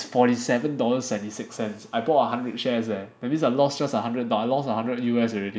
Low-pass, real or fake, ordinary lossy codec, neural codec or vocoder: none; real; none; none